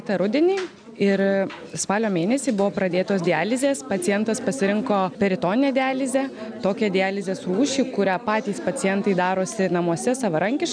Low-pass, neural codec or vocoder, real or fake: 9.9 kHz; none; real